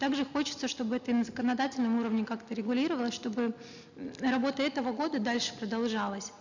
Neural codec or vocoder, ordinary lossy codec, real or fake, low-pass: none; none; real; 7.2 kHz